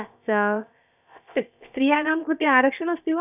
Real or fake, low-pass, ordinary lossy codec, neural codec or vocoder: fake; 3.6 kHz; none; codec, 16 kHz, about 1 kbps, DyCAST, with the encoder's durations